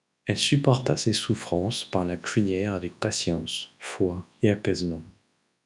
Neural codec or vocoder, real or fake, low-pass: codec, 24 kHz, 0.9 kbps, WavTokenizer, large speech release; fake; 10.8 kHz